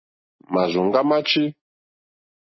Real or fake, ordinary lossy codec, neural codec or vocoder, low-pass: real; MP3, 24 kbps; none; 7.2 kHz